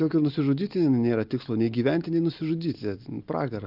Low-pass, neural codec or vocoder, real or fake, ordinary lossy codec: 5.4 kHz; none; real; Opus, 24 kbps